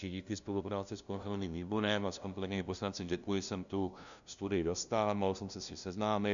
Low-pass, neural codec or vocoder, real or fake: 7.2 kHz; codec, 16 kHz, 0.5 kbps, FunCodec, trained on LibriTTS, 25 frames a second; fake